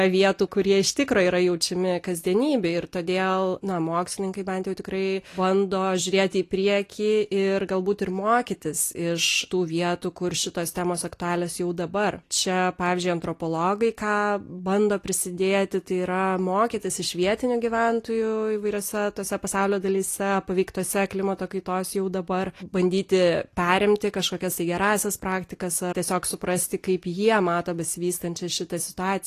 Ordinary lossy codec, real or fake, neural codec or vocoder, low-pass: AAC, 48 kbps; real; none; 14.4 kHz